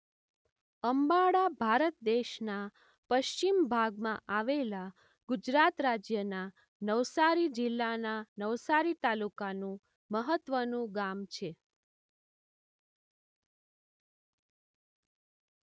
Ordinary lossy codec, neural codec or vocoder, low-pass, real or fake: none; none; none; real